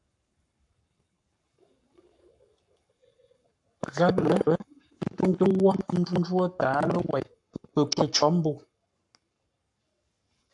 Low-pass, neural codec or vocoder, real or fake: 10.8 kHz; codec, 44.1 kHz, 7.8 kbps, Pupu-Codec; fake